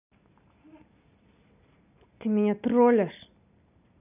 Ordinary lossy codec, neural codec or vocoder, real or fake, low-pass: none; none; real; 3.6 kHz